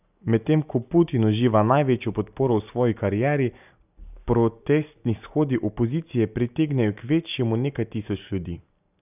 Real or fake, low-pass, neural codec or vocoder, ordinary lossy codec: real; 3.6 kHz; none; none